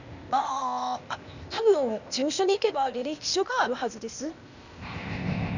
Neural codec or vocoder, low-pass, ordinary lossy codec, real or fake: codec, 16 kHz, 0.8 kbps, ZipCodec; 7.2 kHz; none; fake